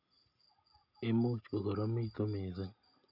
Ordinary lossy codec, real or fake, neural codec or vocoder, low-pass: none; real; none; 5.4 kHz